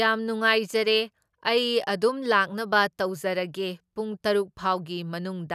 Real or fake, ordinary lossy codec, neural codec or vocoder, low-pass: real; none; none; 14.4 kHz